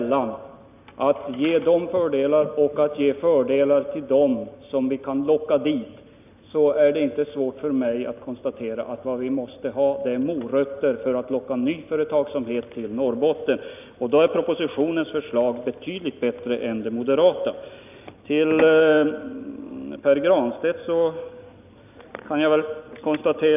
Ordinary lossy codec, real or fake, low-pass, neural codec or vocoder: none; real; 3.6 kHz; none